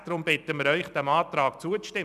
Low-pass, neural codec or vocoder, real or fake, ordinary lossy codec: 14.4 kHz; vocoder, 44.1 kHz, 128 mel bands every 256 samples, BigVGAN v2; fake; none